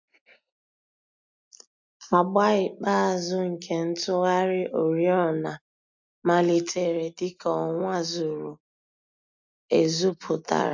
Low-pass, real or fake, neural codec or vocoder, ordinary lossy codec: 7.2 kHz; real; none; MP3, 64 kbps